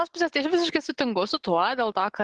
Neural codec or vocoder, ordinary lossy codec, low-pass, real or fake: none; Opus, 16 kbps; 10.8 kHz; real